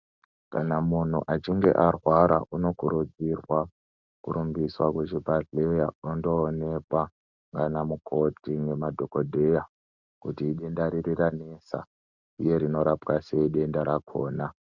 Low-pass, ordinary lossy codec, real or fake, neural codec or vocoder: 7.2 kHz; Opus, 64 kbps; real; none